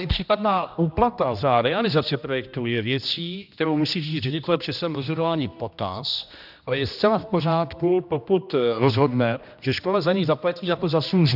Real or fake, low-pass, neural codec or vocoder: fake; 5.4 kHz; codec, 16 kHz, 1 kbps, X-Codec, HuBERT features, trained on general audio